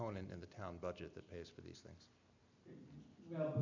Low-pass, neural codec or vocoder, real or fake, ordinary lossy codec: 7.2 kHz; none; real; MP3, 64 kbps